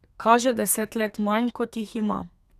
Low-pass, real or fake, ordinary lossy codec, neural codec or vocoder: 14.4 kHz; fake; none; codec, 32 kHz, 1.9 kbps, SNAC